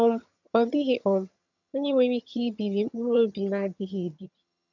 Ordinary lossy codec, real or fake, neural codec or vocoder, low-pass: none; fake; vocoder, 22.05 kHz, 80 mel bands, HiFi-GAN; 7.2 kHz